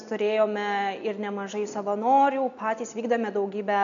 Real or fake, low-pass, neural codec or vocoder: real; 7.2 kHz; none